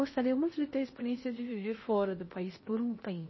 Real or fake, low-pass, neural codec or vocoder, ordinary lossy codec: fake; 7.2 kHz; codec, 16 kHz in and 24 kHz out, 0.8 kbps, FocalCodec, streaming, 65536 codes; MP3, 24 kbps